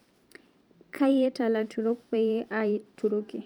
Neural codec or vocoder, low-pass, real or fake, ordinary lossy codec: vocoder, 44.1 kHz, 128 mel bands every 512 samples, BigVGAN v2; 19.8 kHz; fake; none